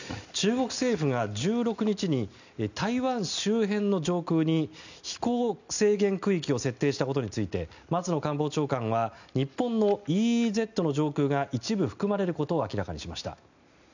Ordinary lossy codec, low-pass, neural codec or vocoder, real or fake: none; 7.2 kHz; none; real